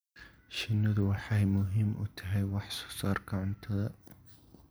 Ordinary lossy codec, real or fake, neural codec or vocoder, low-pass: none; real; none; none